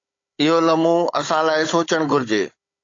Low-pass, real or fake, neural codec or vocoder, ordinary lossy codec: 7.2 kHz; fake; codec, 16 kHz, 16 kbps, FunCodec, trained on Chinese and English, 50 frames a second; AAC, 48 kbps